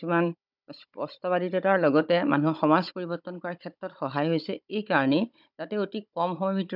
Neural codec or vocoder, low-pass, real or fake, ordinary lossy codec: codec, 16 kHz, 16 kbps, FunCodec, trained on Chinese and English, 50 frames a second; 5.4 kHz; fake; none